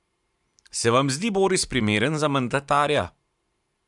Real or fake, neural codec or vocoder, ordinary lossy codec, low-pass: fake; vocoder, 44.1 kHz, 128 mel bands every 512 samples, BigVGAN v2; MP3, 96 kbps; 10.8 kHz